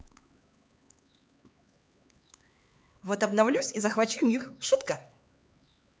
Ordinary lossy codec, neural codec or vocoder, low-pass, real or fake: none; codec, 16 kHz, 4 kbps, X-Codec, HuBERT features, trained on LibriSpeech; none; fake